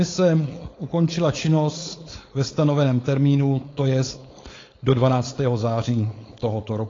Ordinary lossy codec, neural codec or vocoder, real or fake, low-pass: AAC, 32 kbps; codec, 16 kHz, 4.8 kbps, FACodec; fake; 7.2 kHz